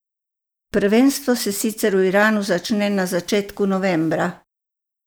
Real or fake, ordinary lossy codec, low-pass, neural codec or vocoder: real; none; none; none